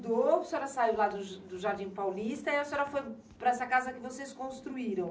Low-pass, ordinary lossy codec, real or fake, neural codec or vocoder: none; none; real; none